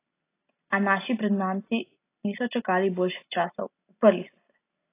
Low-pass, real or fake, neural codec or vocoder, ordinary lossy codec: 3.6 kHz; real; none; AAC, 24 kbps